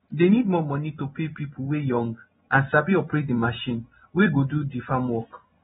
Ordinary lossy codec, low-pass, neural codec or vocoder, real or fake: AAC, 16 kbps; 19.8 kHz; none; real